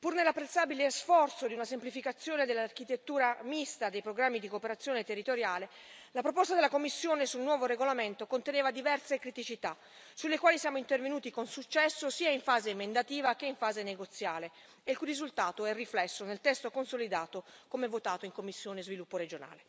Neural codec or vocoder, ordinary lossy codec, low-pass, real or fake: none; none; none; real